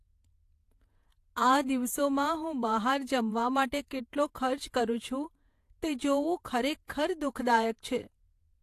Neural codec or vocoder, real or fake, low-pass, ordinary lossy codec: vocoder, 48 kHz, 128 mel bands, Vocos; fake; 14.4 kHz; AAC, 64 kbps